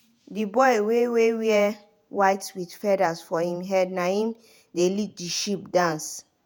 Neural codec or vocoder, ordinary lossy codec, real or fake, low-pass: vocoder, 48 kHz, 128 mel bands, Vocos; none; fake; none